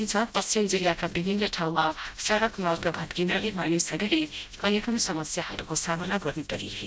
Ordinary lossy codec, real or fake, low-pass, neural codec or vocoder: none; fake; none; codec, 16 kHz, 0.5 kbps, FreqCodec, smaller model